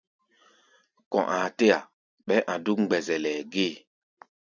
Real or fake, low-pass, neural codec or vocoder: real; 7.2 kHz; none